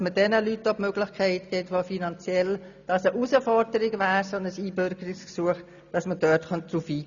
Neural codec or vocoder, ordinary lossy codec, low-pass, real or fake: none; none; 7.2 kHz; real